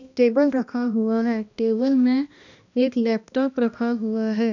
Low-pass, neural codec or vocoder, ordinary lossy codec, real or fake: 7.2 kHz; codec, 16 kHz, 1 kbps, X-Codec, HuBERT features, trained on balanced general audio; none; fake